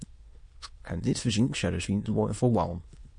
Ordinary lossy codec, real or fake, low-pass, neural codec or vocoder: MP3, 48 kbps; fake; 9.9 kHz; autoencoder, 22.05 kHz, a latent of 192 numbers a frame, VITS, trained on many speakers